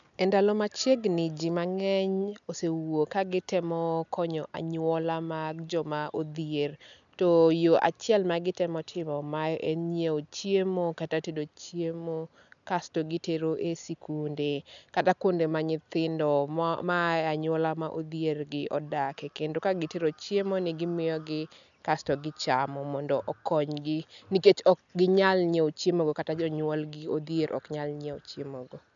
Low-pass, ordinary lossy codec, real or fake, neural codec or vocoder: 7.2 kHz; none; real; none